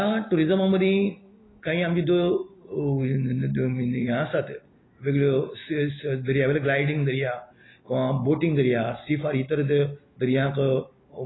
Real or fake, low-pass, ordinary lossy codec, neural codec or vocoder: real; 7.2 kHz; AAC, 16 kbps; none